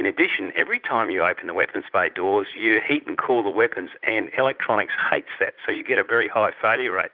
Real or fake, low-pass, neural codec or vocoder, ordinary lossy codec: fake; 5.4 kHz; vocoder, 44.1 kHz, 80 mel bands, Vocos; Opus, 24 kbps